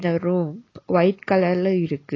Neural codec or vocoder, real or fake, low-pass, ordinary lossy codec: none; real; 7.2 kHz; AAC, 32 kbps